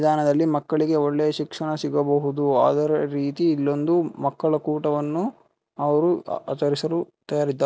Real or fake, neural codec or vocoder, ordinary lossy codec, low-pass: real; none; none; none